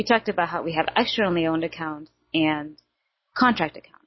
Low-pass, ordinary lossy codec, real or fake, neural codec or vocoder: 7.2 kHz; MP3, 24 kbps; real; none